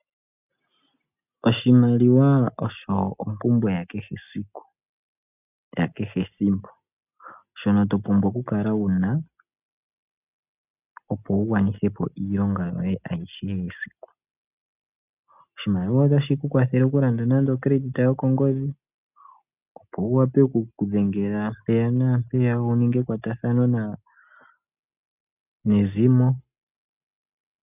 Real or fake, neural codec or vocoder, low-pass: real; none; 3.6 kHz